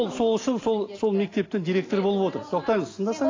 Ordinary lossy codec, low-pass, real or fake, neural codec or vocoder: AAC, 32 kbps; 7.2 kHz; real; none